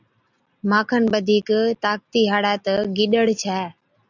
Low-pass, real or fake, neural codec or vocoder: 7.2 kHz; real; none